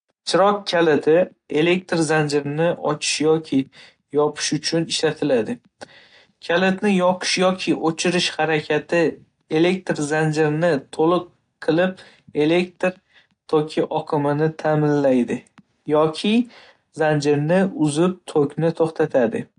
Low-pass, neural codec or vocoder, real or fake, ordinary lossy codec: 9.9 kHz; none; real; AAC, 48 kbps